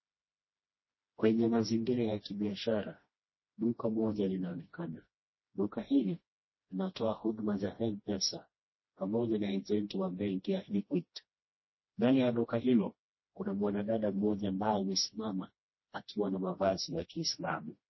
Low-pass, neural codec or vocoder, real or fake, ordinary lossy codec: 7.2 kHz; codec, 16 kHz, 1 kbps, FreqCodec, smaller model; fake; MP3, 24 kbps